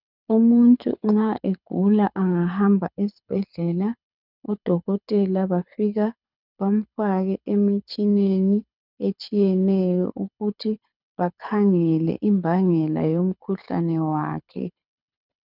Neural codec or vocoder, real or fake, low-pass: codec, 24 kHz, 6 kbps, HILCodec; fake; 5.4 kHz